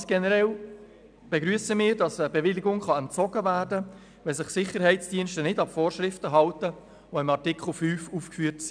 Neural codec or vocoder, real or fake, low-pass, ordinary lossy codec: none; real; 9.9 kHz; none